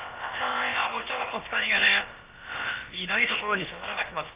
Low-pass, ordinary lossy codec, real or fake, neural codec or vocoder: 3.6 kHz; Opus, 24 kbps; fake; codec, 16 kHz, about 1 kbps, DyCAST, with the encoder's durations